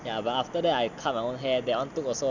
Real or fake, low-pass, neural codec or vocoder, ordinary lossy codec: real; 7.2 kHz; none; none